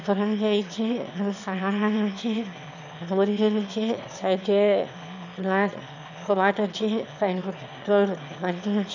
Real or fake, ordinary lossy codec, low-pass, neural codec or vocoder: fake; none; 7.2 kHz; autoencoder, 22.05 kHz, a latent of 192 numbers a frame, VITS, trained on one speaker